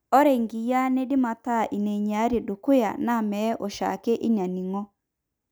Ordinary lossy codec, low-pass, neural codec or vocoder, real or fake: none; none; none; real